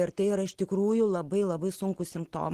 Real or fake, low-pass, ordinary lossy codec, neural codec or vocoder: real; 14.4 kHz; Opus, 16 kbps; none